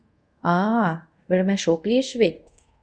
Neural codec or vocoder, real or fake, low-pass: codec, 24 kHz, 0.5 kbps, DualCodec; fake; 9.9 kHz